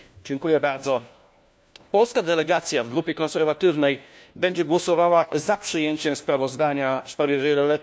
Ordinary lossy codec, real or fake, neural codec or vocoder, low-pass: none; fake; codec, 16 kHz, 1 kbps, FunCodec, trained on LibriTTS, 50 frames a second; none